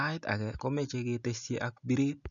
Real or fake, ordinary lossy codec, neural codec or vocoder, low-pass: real; none; none; 7.2 kHz